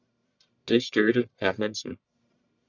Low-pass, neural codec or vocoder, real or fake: 7.2 kHz; codec, 44.1 kHz, 1.7 kbps, Pupu-Codec; fake